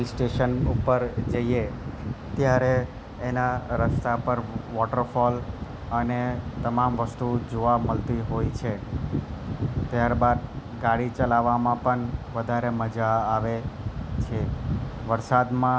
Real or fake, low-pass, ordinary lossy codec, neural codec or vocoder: real; none; none; none